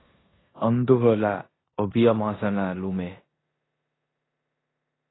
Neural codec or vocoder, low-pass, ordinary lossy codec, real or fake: codec, 16 kHz in and 24 kHz out, 0.9 kbps, LongCat-Audio-Codec, four codebook decoder; 7.2 kHz; AAC, 16 kbps; fake